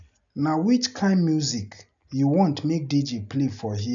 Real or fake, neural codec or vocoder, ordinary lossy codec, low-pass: real; none; none; 7.2 kHz